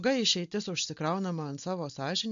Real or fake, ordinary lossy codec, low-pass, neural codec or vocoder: real; MP3, 48 kbps; 7.2 kHz; none